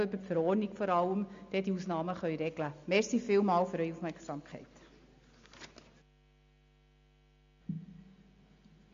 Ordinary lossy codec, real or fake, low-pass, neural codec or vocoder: MP3, 48 kbps; real; 7.2 kHz; none